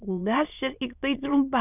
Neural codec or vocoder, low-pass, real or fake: autoencoder, 22.05 kHz, a latent of 192 numbers a frame, VITS, trained on many speakers; 3.6 kHz; fake